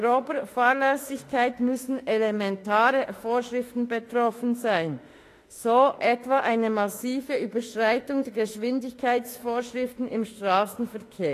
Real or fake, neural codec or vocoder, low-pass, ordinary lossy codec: fake; autoencoder, 48 kHz, 32 numbers a frame, DAC-VAE, trained on Japanese speech; 14.4 kHz; AAC, 48 kbps